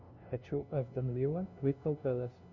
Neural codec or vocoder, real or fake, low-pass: codec, 16 kHz, 0.5 kbps, FunCodec, trained on LibriTTS, 25 frames a second; fake; 7.2 kHz